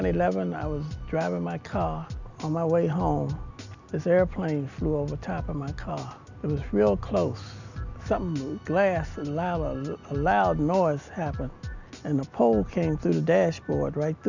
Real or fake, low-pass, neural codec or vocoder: real; 7.2 kHz; none